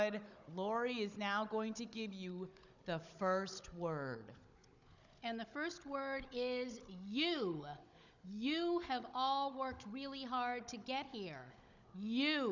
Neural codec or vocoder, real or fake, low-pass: codec, 16 kHz, 16 kbps, FunCodec, trained on Chinese and English, 50 frames a second; fake; 7.2 kHz